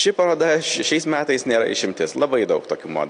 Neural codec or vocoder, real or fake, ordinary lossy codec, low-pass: none; real; AAC, 48 kbps; 9.9 kHz